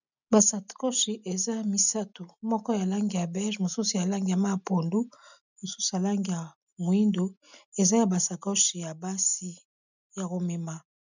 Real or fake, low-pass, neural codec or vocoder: real; 7.2 kHz; none